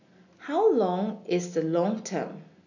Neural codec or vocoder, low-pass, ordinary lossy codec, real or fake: none; 7.2 kHz; none; real